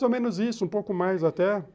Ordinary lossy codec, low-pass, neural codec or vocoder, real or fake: none; none; none; real